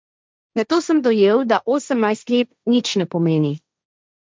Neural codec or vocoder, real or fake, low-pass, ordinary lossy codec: codec, 16 kHz, 1.1 kbps, Voila-Tokenizer; fake; none; none